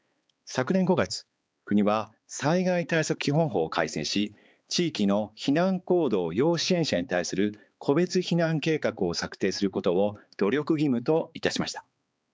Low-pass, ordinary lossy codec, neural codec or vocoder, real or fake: none; none; codec, 16 kHz, 4 kbps, X-Codec, HuBERT features, trained on balanced general audio; fake